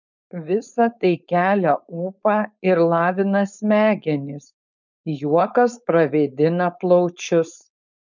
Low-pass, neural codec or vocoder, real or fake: 7.2 kHz; codec, 16 kHz, 4.8 kbps, FACodec; fake